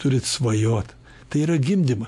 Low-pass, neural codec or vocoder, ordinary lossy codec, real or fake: 14.4 kHz; none; MP3, 64 kbps; real